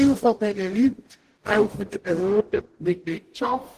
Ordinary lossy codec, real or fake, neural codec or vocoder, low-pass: Opus, 16 kbps; fake; codec, 44.1 kHz, 0.9 kbps, DAC; 14.4 kHz